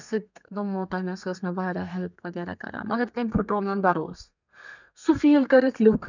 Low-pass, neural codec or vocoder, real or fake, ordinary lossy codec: 7.2 kHz; codec, 32 kHz, 1.9 kbps, SNAC; fake; none